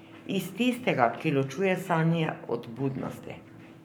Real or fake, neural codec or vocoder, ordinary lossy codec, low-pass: fake; codec, 44.1 kHz, 7.8 kbps, Pupu-Codec; none; none